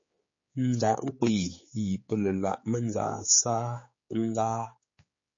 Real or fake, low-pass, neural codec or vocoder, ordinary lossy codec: fake; 7.2 kHz; codec, 16 kHz, 4 kbps, X-Codec, HuBERT features, trained on general audio; MP3, 32 kbps